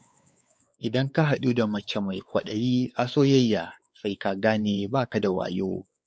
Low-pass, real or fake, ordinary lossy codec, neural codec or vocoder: none; fake; none; codec, 16 kHz, 4 kbps, X-Codec, WavLM features, trained on Multilingual LibriSpeech